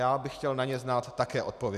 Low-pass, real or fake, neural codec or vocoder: 14.4 kHz; real; none